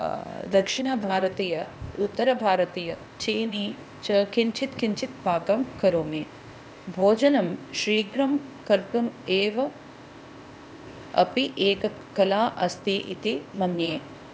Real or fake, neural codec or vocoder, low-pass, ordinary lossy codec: fake; codec, 16 kHz, 0.8 kbps, ZipCodec; none; none